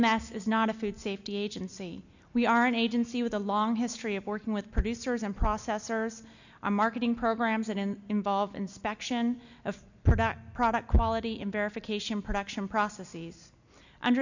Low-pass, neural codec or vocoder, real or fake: 7.2 kHz; none; real